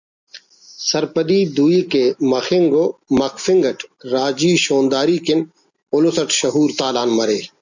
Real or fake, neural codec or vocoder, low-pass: real; none; 7.2 kHz